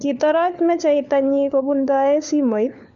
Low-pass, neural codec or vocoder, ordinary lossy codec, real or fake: 7.2 kHz; codec, 16 kHz, 4 kbps, FunCodec, trained on LibriTTS, 50 frames a second; none; fake